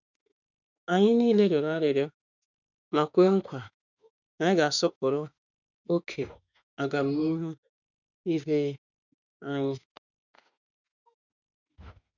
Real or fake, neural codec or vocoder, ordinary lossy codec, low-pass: fake; autoencoder, 48 kHz, 32 numbers a frame, DAC-VAE, trained on Japanese speech; none; 7.2 kHz